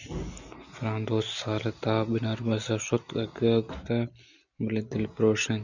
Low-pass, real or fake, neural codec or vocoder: 7.2 kHz; real; none